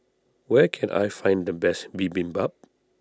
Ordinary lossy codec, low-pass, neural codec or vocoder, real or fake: none; none; none; real